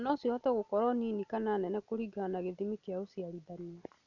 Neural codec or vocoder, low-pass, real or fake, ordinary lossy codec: none; 7.2 kHz; real; none